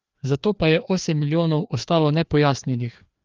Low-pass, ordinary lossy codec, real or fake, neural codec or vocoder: 7.2 kHz; Opus, 32 kbps; fake; codec, 16 kHz, 2 kbps, FreqCodec, larger model